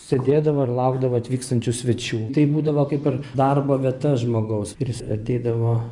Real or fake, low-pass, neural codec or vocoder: fake; 10.8 kHz; vocoder, 24 kHz, 100 mel bands, Vocos